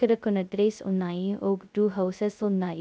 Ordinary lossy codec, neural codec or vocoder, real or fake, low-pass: none; codec, 16 kHz, 0.2 kbps, FocalCodec; fake; none